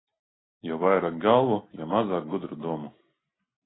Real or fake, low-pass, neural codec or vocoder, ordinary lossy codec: real; 7.2 kHz; none; AAC, 16 kbps